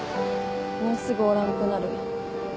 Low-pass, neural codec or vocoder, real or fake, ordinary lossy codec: none; none; real; none